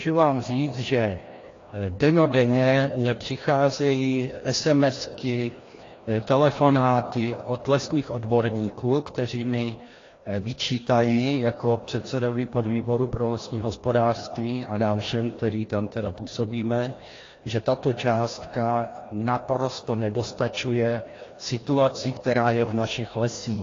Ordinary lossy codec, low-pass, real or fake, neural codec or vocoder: AAC, 32 kbps; 7.2 kHz; fake; codec, 16 kHz, 1 kbps, FreqCodec, larger model